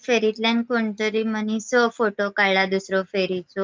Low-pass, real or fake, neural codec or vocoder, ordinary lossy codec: 7.2 kHz; real; none; Opus, 24 kbps